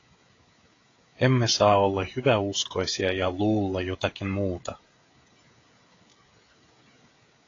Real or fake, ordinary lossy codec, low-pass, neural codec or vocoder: fake; AAC, 32 kbps; 7.2 kHz; codec, 16 kHz, 16 kbps, FreqCodec, smaller model